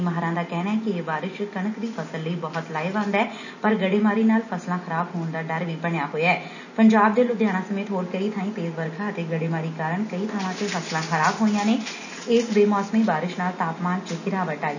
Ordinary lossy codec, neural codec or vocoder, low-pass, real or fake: none; none; 7.2 kHz; real